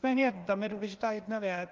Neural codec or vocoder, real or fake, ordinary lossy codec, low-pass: codec, 16 kHz, 0.8 kbps, ZipCodec; fake; Opus, 32 kbps; 7.2 kHz